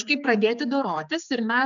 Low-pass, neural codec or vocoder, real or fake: 7.2 kHz; codec, 16 kHz, 4 kbps, X-Codec, HuBERT features, trained on general audio; fake